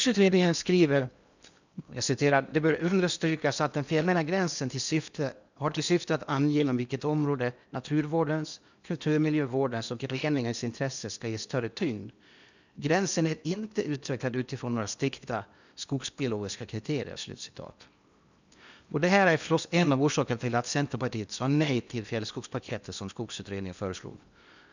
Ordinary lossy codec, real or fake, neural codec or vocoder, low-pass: none; fake; codec, 16 kHz in and 24 kHz out, 0.8 kbps, FocalCodec, streaming, 65536 codes; 7.2 kHz